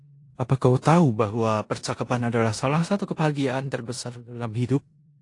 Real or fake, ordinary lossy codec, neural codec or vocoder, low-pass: fake; AAC, 48 kbps; codec, 16 kHz in and 24 kHz out, 0.9 kbps, LongCat-Audio-Codec, four codebook decoder; 10.8 kHz